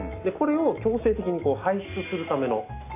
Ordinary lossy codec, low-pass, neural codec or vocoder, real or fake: AAC, 24 kbps; 3.6 kHz; none; real